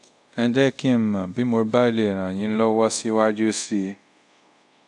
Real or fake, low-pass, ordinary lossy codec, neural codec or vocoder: fake; 10.8 kHz; none; codec, 24 kHz, 0.5 kbps, DualCodec